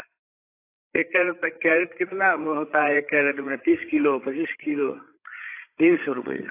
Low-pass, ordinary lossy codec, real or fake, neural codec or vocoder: 3.6 kHz; AAC, 24 kbps; fake; codec, 16 kHz, 8 kbps, FreqCodec, larger model